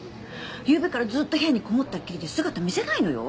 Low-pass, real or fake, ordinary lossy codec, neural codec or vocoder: none; real; none; none